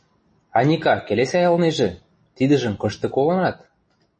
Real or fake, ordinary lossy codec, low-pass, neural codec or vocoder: real; MP3, 32 kbps; 9.9 kHz; none